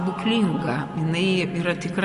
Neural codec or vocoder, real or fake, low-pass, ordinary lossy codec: none; real; 14.4 kHz; MP3, 48 kbps